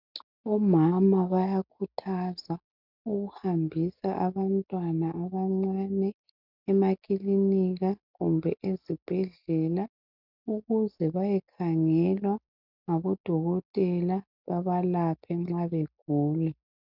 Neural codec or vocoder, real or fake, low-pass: none; real; 5.4 kHz